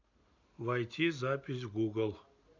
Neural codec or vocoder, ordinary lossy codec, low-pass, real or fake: none; MP3, 64 kbps; 7.2 kHz; real